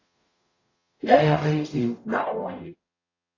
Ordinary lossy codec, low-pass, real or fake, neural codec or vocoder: AAC, 32 kbps; 7.2 kHz; fake; codec, 44.1 kHz, 0.9 kbps, DAC